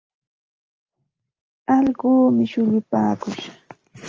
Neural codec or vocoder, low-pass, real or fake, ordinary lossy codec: none; 7.2 kHz; real; Opus, 24 kbps